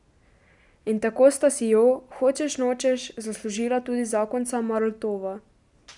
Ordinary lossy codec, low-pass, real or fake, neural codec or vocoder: none; 10.8 kHz; real; none